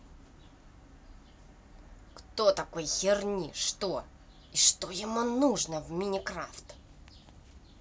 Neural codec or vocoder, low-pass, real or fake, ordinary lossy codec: none; none; real; none